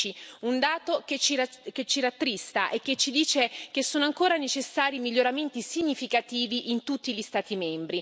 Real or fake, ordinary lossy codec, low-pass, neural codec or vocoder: real; none; none; none